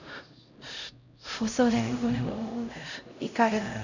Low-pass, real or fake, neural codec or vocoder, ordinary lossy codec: 7.2 kHz; fake; codec, 16 kHz, 0.5 kbps, X-Codec, HuBERT features, trained on LibriSpeech; none